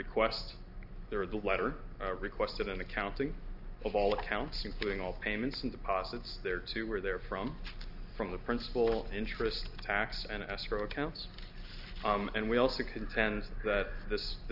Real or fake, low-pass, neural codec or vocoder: real; 5.4 kHz; none